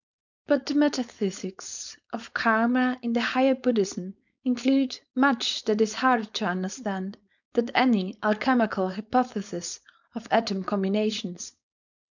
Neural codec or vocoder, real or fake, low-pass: codec, 16 kHz, 4.8 kbps, FACodec; fake; 7.2 kHz